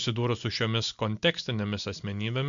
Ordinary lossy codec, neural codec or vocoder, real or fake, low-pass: MP3, 64 kbps; none; real; 7.2 kHz